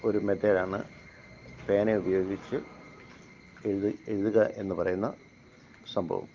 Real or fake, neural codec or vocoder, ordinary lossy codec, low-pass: real; none; Opus, 24 kbps; 7.2 kHz